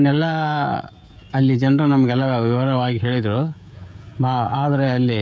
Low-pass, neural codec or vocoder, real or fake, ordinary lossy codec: none; codec, 16 kHz, 16 kbps, FreqCodec, smaller model; fake; none